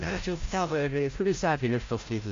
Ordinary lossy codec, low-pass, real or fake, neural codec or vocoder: AAC, 48 kbps; 7.2 kHz; fake; codec, 16 kHz, 0.5 kbps, FreqCodec, larger model